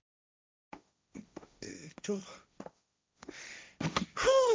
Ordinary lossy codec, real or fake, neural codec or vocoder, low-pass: none; fake; codec, 16 kHz, 1.1 kbps, Voila-Tokenizer; none